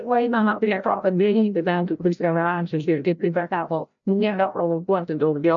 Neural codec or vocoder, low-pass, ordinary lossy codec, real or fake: codec, 16 kHz, 0.5 kbps, FreqCodec, larger model; 7.2 kHz; MP3, 64 kbps; fake